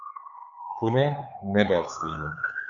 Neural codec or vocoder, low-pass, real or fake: codec, 16 kHz, 4 kbps, X-Codec, HuBERT features, trained on LibriSpeech; 7.2 kHz; fake